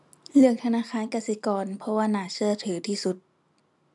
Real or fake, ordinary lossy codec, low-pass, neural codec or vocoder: real; none; 10.8 kHz; none